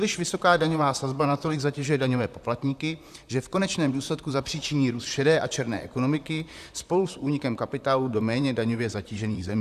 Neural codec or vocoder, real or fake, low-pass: vocoder, 44.1 kHz, 128 mel bands, Pupu-Vocoder; fake; 14.4 kHz